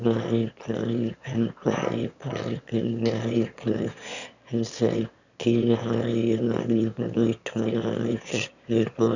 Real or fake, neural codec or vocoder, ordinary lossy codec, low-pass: fake; autoencoder, 22.05 kHz, a latent of 192 numbers a frame, VITS, trained on one speaker; none; 7.2 kHz